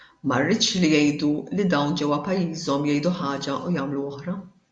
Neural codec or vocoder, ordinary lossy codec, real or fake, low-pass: none; MP3, 64 kbps; real; 9.9 kHz